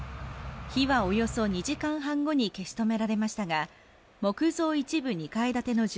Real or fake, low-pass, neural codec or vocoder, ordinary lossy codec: real; none; none; none